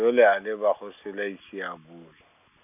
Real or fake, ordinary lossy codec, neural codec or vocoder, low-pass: real; none; none; 3.6 kHz